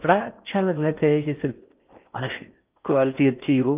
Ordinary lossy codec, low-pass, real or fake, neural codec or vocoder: Opus, 24 kbps; 3.6 kHz; fake; codec, 16 kHz in and 24 kHz out, 0.6 kbps, FocalCodec, streaming, 4096 codes